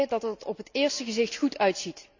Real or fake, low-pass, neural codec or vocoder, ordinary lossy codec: real; 7.2 kHz; none; Opus, 64 kbps